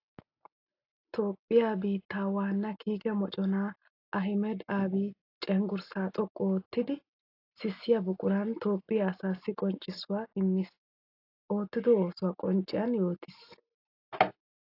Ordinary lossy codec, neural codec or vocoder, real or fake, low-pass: AAC, 32 kbps; none; real; 5.4 kHz